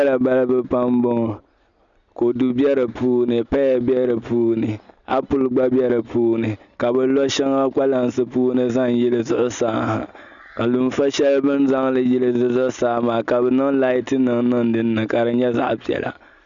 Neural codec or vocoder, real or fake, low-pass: none; real; 7.2 kHz